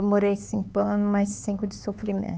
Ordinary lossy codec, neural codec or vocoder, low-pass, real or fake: none; codec, 16 kHz, 4 kbps, X-Codec, HuBERT features, trained on LibriSpeech; none; fake